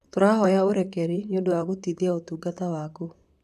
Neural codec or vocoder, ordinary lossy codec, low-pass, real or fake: vocoder, 44.1 kHz, 128 mel bands, Pupu-Vocoder; none; 14.4 kHz; fake